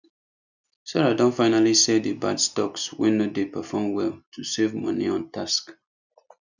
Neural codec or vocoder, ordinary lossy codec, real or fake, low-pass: none; none; real; 7.2 kHz